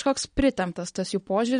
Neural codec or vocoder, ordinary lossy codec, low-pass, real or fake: none; MP3, 48 kbps; 9.9 kHz; real